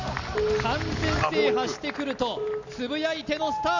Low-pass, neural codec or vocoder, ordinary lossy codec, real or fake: 7.2 kHz; none; Opus, 64 kbps; real